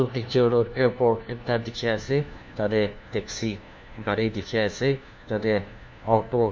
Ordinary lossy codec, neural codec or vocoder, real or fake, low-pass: none; codec, 16 kHz, 1 kbps, FunCodec, trained on LibriTTS, 50 frames a second; fake; none